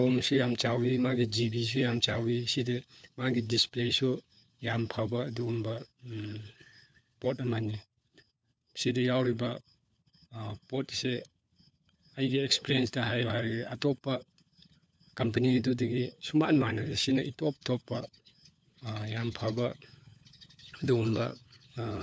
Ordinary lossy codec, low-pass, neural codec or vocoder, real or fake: none; none; codec, 16 kHz, 4 kbps, FunCodec, trained on LibriTTS, 50 frames a second; fake